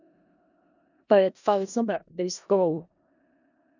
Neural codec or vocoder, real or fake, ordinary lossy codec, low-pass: codec, 16 kHz in and 24 kHz out, 0.4 kbps, LongCat-Audio-Codec, four codebook decoder; fake; none; 7.2 kHz